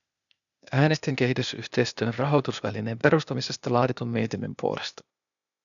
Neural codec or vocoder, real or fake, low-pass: codec, 16 kHz, 0.8 kbps, ZipCodec; fake; 7.2 kHz